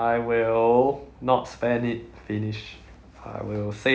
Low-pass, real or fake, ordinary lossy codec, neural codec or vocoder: none; real; none; none